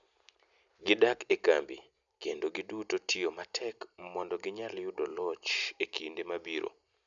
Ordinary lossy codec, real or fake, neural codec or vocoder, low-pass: none; real; none; 7.2 kHz